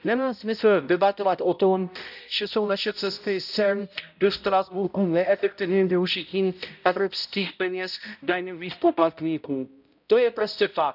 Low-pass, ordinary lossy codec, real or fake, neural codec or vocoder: 5.4 kHz; none; fake; codec, 16 kHz, 0.5 kbps, X-Codec, HuBERT features, trained on balanced general audio